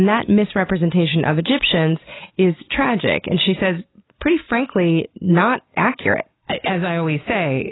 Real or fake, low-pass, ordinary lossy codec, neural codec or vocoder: real; 7.2 kHz; AAC, 16 kbps; none